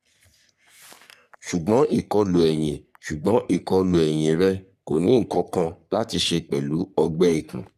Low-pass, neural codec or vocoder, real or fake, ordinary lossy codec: 14.4 kHz; codec, 44.1 kHz, 3.4 kbps, Pupu-Codec; fake; none